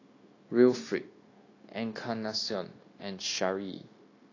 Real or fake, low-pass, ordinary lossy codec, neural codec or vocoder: fake; 7.2 kHz; AAC, 32 kbps; codec, 16 kHz, 0.9 kbps, LongCat-Audio-Codec